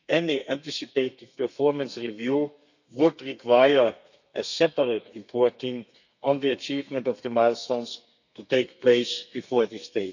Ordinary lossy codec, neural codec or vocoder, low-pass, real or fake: none; codec, 32 kHz, 1.9 kbps, SNAC; 7.2 kHz; fake